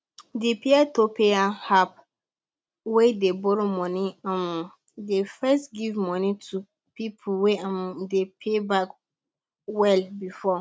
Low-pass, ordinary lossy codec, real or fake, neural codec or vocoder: none; none; real; none